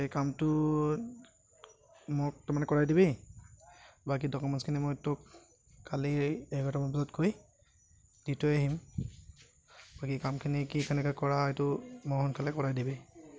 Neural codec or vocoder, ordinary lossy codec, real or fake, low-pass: none; none; real; none